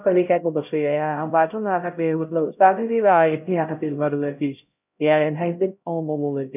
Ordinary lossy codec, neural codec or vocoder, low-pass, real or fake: none; codec, 16 kHz, 0.5 kbps, X-Codec, HuBERT features, trained on LibriSpeech; 3.6 kHz; fake